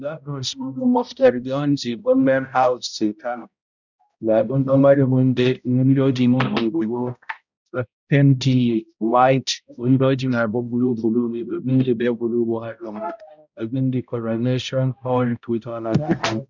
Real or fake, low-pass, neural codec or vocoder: fake; 7.2 kHz; codec, 16 kHz, 0.5 kbps, X-Codec, HuBERT features, trained on balanced general audio